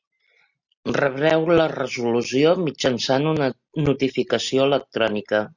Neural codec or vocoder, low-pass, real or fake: none; 7.2 kHz; real